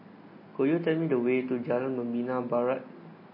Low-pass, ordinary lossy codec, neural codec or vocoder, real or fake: 5.4 kHz; MP3, 24 kbps; none; real